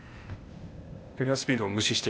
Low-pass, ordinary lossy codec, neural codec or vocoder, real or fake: none; none; codec, 16 kHz, 0.8 kbps, ZipCodec; fake